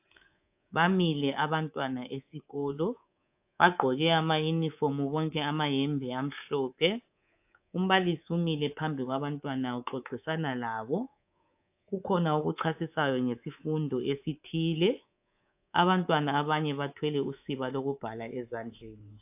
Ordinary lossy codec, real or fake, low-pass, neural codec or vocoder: AAC, 32 kbps; fake; 3.6 kHz; codec, 24 kHz, 3.1 kbps, DualCodec